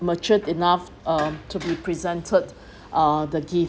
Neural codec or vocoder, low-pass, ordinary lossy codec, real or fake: none; none; none; real